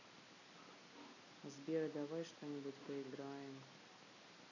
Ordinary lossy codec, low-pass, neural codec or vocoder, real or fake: none; 7.2 kHz; none; real